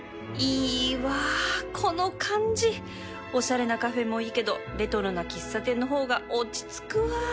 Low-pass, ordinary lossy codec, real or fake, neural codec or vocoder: none; none; real; none